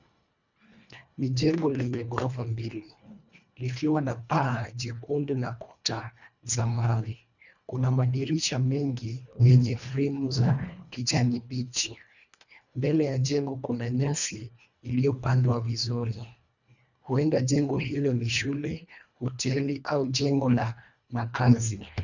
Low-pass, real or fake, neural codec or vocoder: 7.2 kHz; fake; codec, 24 kHz, 1.5 kbps, HILCodec